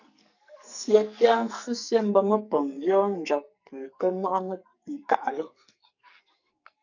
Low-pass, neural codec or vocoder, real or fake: 7.2 kHz; codec, 44.1 kHz, 2.6 kbps, SNAC; fake